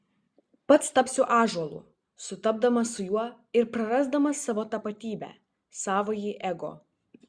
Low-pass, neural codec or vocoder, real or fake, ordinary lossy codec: 9.9 kHz; none; real; Opus, 64 kbps